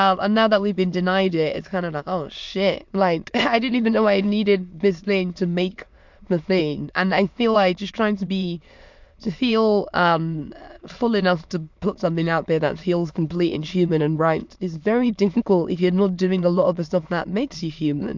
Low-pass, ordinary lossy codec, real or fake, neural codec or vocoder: 7.2 kHz; MP3, 64 kbps; fake; autoencoder, 22.05 kHz, a latent of 192 numbers a frame, VITS, trained on many speakers